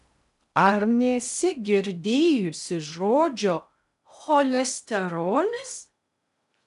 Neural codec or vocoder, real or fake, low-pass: codec, 16 kHz in and 24 kHz out, 0.6 kbps, FocalCodec, streaming, 2048 codes; fake; 10.8 kHz